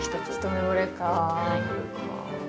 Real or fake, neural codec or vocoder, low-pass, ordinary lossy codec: real; none; none; none